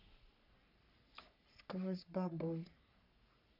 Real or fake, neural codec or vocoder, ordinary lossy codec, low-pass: fake; codec, 44.1 kHz, 3.4 kbps, Pupu-Codec; none; 5.4 kHz